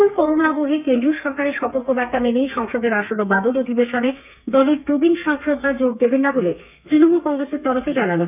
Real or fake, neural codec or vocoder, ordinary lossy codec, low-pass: fake; codec, 32 kHz, 1.9 kbps, SNAC; none; 3.6 kHz